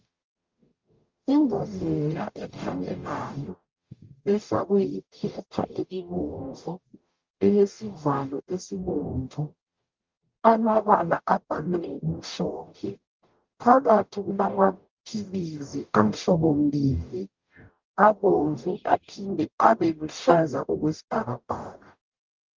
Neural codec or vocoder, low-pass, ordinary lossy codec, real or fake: codec, 44.1 kHz, 0.9 kbps, DAC; 7.2 kHz; Opus, 32 kbps; fake